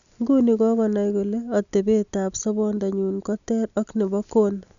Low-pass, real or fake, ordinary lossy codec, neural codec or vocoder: 7.2 kHz; real; none; none